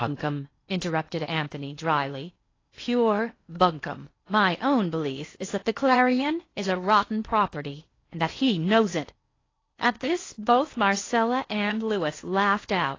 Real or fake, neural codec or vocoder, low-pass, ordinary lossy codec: fake; codec, 16 kHz in and 24 kHz out, 0.8 kbps, FocalCodec, streaming, 65536 codes; 7.2 kHz; AAC, 32 kbps